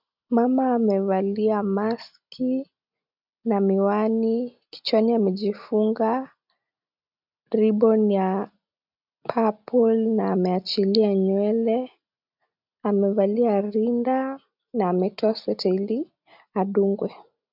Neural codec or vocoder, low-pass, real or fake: none; 5.4 kHz; real